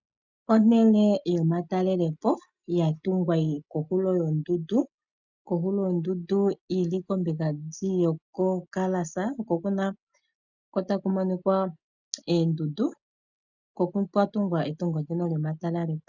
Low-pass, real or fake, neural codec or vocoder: 7.2 kHz; real; none